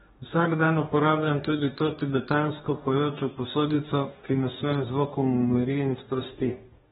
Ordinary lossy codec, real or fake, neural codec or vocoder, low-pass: AAC, 16 kbps; fake; codec, 44.1 kHz, 2.6 kbps, DAC; 19.8 kHz